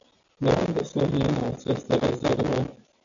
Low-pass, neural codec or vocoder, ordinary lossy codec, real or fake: 7.2 kHz; none; MP3, 96 kbps; real